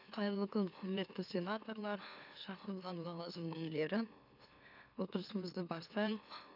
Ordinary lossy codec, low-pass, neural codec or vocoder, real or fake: none; 5.4 kHz; autoencoder, 44.1 kHz, a latent of 192 numbers a frame, MeloTTS; fake